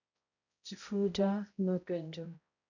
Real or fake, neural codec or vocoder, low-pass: fake; codec, 16 kHz, 0.5 kbps, X-Codec, HuBERT features, trained on balanced general audio; 7.2 kHz